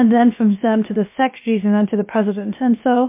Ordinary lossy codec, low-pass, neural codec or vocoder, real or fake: MP3, 24 kbps; 3.6 kHz; codec, 16 kHz, about 1 kbps, DyCAST, with the encoder's durations; fake